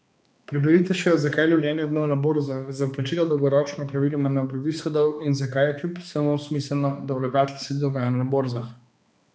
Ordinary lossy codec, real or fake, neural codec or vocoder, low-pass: none; fake; codec, 16 kHz, 2 kbps, X-Codec, HuBERT features, trained on balanced general audio; none